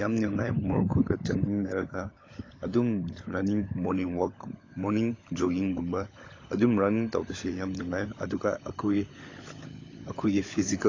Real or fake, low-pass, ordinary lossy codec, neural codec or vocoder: fake; 7.2 kHz; AAC, 32 kbps; codec, 16 kHz, 16 kbps, FunCodec, trained on LibriTTS, 50 frames a second